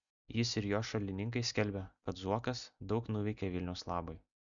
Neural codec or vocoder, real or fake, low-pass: none; real; 7.2 kHz